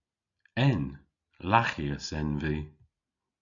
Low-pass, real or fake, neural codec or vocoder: 7.2 kHz; real; none